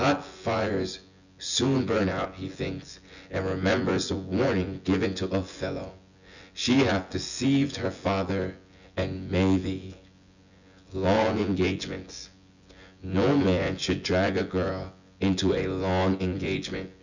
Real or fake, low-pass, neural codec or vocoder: fake; 7.2 kHz; vocoder, 24 kHz, 100 mel bands, Vocos